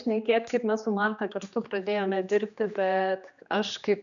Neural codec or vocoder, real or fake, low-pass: codec, 16 kHz, 2 kbps, X-Codec, HuBERT features, trained on general audio; fake; 7.2 kHz